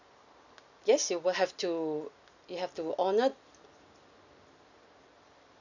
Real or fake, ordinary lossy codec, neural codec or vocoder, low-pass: real; none; none; 7.2 kHz